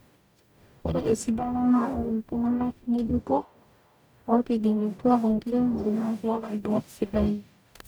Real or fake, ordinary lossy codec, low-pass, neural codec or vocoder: fake; none; none; codec, 44.1 kHz, 0.9 kbps, DAC